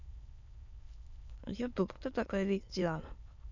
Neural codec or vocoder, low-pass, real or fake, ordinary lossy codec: autoencoder, 22.05 kHz, a latent of 192 numbers a frame, VITS, trained on many speakers; 7.2 kHz; fake; none